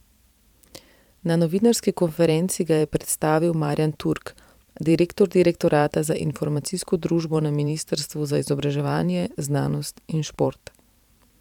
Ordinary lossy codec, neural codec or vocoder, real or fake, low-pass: none; vocoder, 44.1 kHz, 128 mel bands every 512 samples, BigVGAN v2; fake; 19.8 kHz